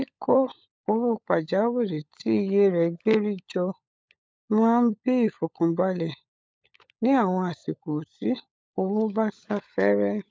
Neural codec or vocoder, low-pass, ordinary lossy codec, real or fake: codec, 16 kHz, 16 kbps, FunCodec, trained on LibriTTS, 50 frames a second; none; none; fake